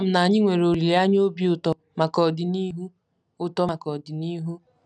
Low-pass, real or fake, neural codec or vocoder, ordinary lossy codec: none; real; none; none